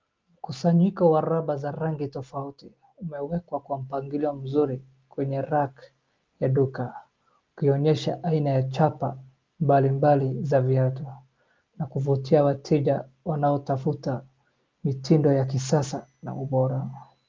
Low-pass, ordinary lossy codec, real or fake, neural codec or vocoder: 7.2 kHz; Opus, 24 kbps; real; none